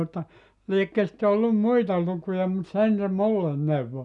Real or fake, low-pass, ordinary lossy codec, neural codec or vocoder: real; 10.8 kHz; none; none